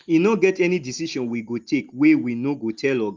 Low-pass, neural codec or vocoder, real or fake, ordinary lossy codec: 7.2 kHz; none; real; Opus, 32 kbps